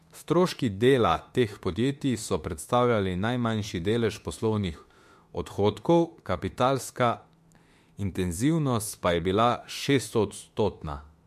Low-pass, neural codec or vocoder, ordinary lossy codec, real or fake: 14.4 kHz; autoencoder, 48 kHz, 32 numbers a frame, DAC-VAE, trained on Japanese speech; MP3, 64 kbps; fake